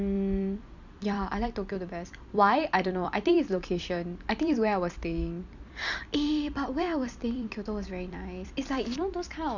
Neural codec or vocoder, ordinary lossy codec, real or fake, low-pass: vocoder, 44.1 kHz, 128 mel bands every 256 samples, BigVGAN v2; none; fake; 7.2 kHz